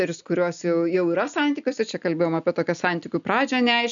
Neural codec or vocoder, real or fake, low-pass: none; real; 7.2 kHz